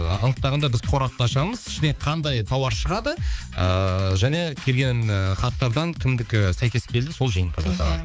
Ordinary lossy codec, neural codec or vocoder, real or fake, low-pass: none; codec, 16 kHz, 4 kbps, X-Codec, HuBERT features, trained on balanced general audio; fake; none